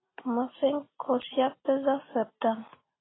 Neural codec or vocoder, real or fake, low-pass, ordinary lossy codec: none; real; 7.2 kHz; AAC, 16 kbps